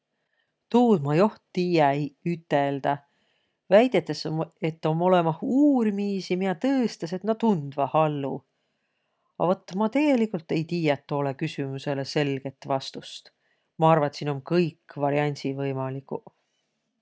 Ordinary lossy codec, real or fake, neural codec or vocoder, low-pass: none; real; none; none